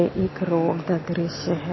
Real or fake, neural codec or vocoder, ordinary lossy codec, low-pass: real; none; MP3, 24 kbps; 7.2 kHz